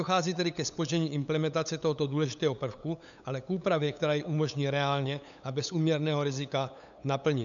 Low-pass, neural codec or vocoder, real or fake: 7.2 kHz; codec, 16 kHz, 8 kbps, FunCodec, trained on LibriTTS, 25 frames a second; fake